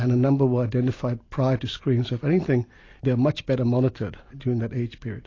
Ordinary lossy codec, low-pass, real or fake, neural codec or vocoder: AAC, 32 kbps; 7.2 kHz; real; none